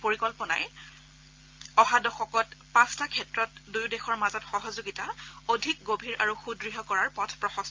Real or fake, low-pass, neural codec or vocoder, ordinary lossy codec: real; 7.2 kHz; none; Opus, 24 kbps